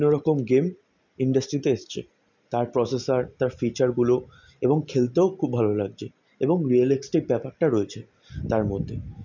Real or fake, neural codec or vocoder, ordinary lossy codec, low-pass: real; none; none; 7.2 kHz